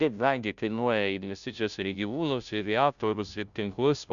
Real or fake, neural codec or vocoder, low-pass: fake; codec, 16 kHz, 0.5 kbps, FunCodec, trained on Chinese and English, 25 frames a second; 7.2 kHz